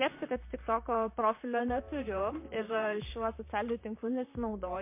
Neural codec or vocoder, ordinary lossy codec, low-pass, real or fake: vocoder, 44.1 kHz, 128 mel bands, Pupu-Vocoder; MP3, 24 kbps; 3.6 kHz; fake